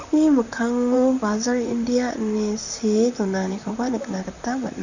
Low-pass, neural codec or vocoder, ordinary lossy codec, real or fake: 7.2 kHz; vocoder, 44.1 kHz, 128 mel bands, Pupu-Vocoder; none; fake